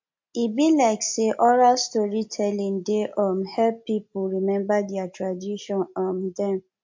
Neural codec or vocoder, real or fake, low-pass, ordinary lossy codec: none; real; 7.2 kHz; MP3, 48 kbps